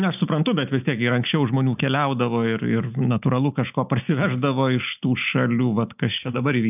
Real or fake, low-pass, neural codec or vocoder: real; 3.6 kHz; none